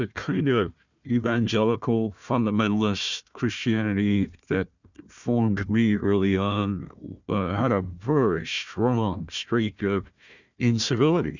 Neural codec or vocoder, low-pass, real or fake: codec, 16 kHz, 1 kbps, FunCodec, trained on Chinese and English, 50 frames a second; 7.2 kHz; fake